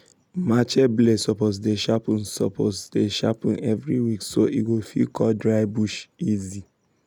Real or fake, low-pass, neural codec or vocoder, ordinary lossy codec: real; 19.8 kHz; none; none